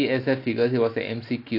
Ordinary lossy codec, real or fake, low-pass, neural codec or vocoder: none; real; 5.4 kHz; none